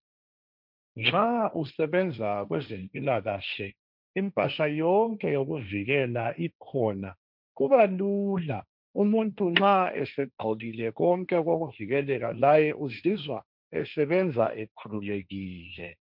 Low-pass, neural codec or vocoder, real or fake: 5.4 kHz; codec, 16 kHz, 1.1 kbps, Voila-Tokenizer; fake